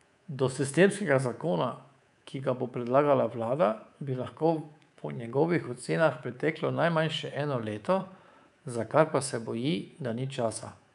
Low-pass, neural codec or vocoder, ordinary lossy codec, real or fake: 10.8 kHz; codec, 24 kHz, 3.1 kbps, DualCodec; none; fake